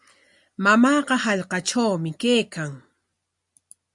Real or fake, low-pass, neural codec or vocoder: real; 10.8 kHz; none